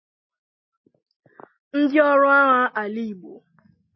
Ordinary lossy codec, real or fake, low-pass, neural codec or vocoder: MP3, 24 kbps; real; 7.2 kHz; none